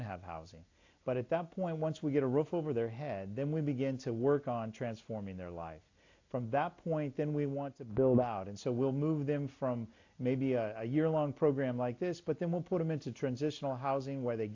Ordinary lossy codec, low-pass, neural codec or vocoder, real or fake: AAC, 48 kbps; 7.2 kHz; none; real